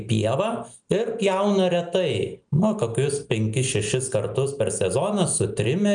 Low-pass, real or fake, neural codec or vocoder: 9.9 kHz; real; none